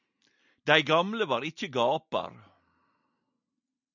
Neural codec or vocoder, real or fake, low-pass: none; real; 7.2 kHz